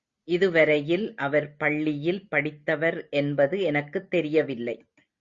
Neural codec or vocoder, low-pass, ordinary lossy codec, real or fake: none; 7.2 kHz; Opus, 64 kbps; real